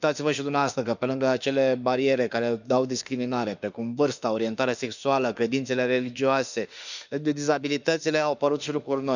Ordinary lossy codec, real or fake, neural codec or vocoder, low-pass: none; fake; autoencoder, 48 kHz, 32 numbers a frame, DAC-VAE, trained on Japanese speech; 7.2 kHz